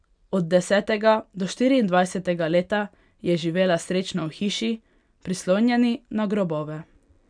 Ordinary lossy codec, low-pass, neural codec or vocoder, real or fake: none; 9.9 kHz; none; real